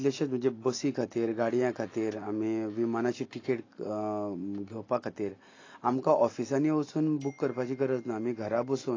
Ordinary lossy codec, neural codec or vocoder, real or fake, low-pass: AAC, 32 kbps; none; real; 7.2 kHz